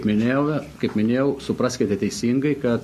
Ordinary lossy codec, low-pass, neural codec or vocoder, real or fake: MP3, 64 kbps; 14.4 kHz; none; real